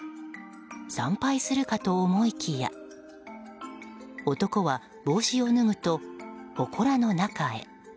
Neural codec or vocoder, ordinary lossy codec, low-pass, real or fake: none; none; none; real